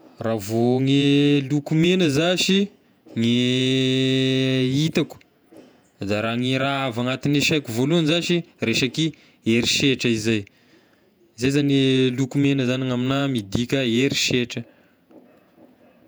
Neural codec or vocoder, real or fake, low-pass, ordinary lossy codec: vocoder, 48 kHz, 128 mel bands, Vocos; fake; none; none